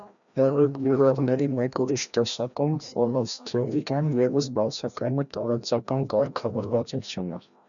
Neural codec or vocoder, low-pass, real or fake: codec, 16 kHz, 1 kbps, FreqCodec, larger model; 7.2 kHz; fake